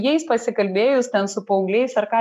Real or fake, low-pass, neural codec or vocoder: real; 14.4 kHz; none